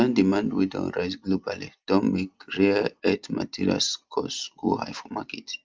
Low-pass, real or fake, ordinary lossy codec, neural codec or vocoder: 7.2 kHz; real; Opus, 32 kbps; none